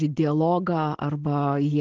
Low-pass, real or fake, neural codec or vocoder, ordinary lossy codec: 7.2 kHz; real; none; Opus, 16 kbps